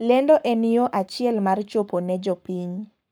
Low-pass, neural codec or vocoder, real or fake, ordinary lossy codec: none; codec, 44.1 kHz, 7.8 kbps, Pupu-Codec; fake; none